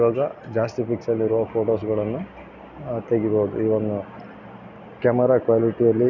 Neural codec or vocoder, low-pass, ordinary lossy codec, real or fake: none; 7.2 kHz; none; real